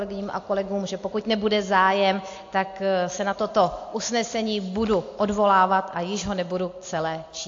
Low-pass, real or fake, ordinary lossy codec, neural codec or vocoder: 7.2 kHz; real; AAC, 48 kbps; none